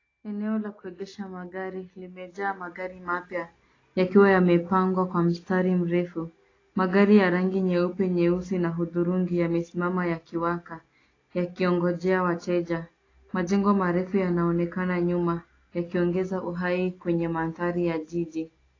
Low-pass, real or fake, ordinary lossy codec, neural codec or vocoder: 7.2 kHz; real; AAC, 32 kbps; none